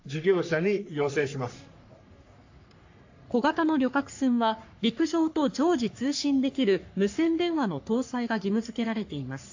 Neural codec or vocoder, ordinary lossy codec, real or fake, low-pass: codec, 44.1 kHz, 3.4 kbps, Pupu-Codec; AAC, 48 kbps; fake; 7.2 kHz